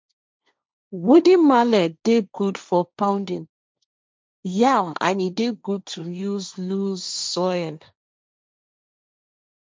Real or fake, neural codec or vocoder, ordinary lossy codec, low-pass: fake; codec, 16 kHz, 1.1 kbps, Voila-Tokenizer; none; none